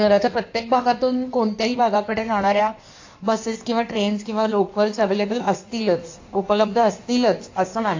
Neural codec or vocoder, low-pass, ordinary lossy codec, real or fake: codec, 16 kHz in and 24 kHz out, 1.1 kbps, FireRedTTS-2 codec; 7.2 kHz; AAC, 48 kbps; fake